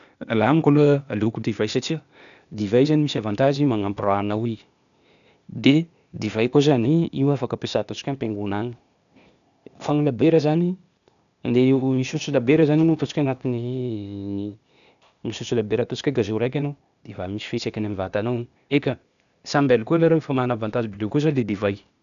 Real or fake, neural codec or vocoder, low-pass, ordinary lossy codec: fake; codec, 16 kHz, 0.8 kbps, ZipCodec; 7.2 kHz; none